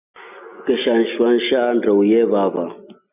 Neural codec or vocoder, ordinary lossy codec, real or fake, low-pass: none; AAC, 32 kbps; real; 3.6 kHz